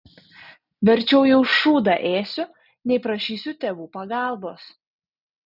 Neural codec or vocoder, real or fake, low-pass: none; real; 5.4 kHz